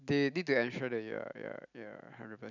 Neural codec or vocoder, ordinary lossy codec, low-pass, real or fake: none; none; 7.2 kHz; real